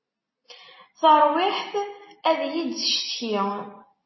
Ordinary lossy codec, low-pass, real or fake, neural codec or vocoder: MP3, 24 kbps; 7.2 kHz; real; none